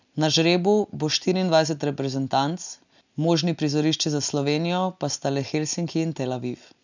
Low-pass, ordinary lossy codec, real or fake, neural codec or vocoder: 7.2 kHz; none; real; none